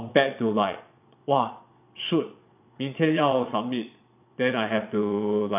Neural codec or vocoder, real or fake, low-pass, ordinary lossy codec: vocoder, 22.05 kHz, 80 mel bands, Vocos; fake; 3.6 kHz; none